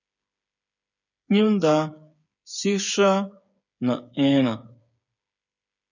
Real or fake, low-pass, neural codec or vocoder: fake; 7.2 kHz; codec, 16 kHz, 8 kbps, FreqCodec, smaller model